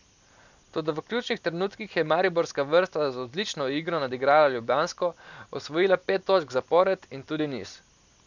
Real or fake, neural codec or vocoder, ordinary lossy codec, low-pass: real; none; none; 7.2 kHz